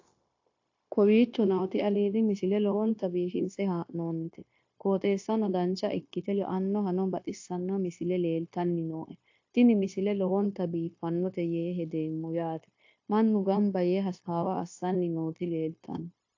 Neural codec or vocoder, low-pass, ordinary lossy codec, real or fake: codec, 16 kHz, 0.9 kbps, LongCat-Audio-Codec; 7.2 kHz; AAC, 48 kbps; fake